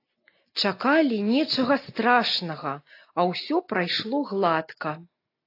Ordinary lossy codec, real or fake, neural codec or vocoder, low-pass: AAC, 32 kbps; real; none; 5.4 kHz